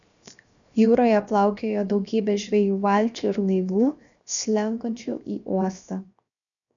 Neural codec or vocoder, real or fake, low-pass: codec, 16 kHz, 0.7 kbps, FocalCodec; fake; 7.2 kHz